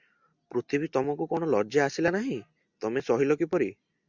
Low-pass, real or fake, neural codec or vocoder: 7.2 kHz; real; none